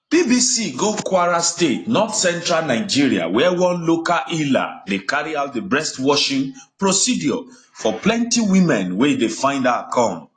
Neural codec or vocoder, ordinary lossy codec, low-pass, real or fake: none; AAC, 32 kbps; 9.9 kHz; real